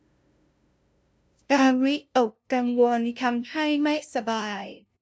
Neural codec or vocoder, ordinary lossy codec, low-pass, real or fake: codec, 16 kHz, 0.5 kbps, FunCodec, trained on LibriTTS, 25 frames a second; none; none; fake